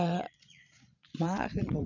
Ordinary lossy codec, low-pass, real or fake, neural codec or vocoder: none; 7.2 kHz; fake; vocoder, 22.05 kHz, 80 mel bands, Vocos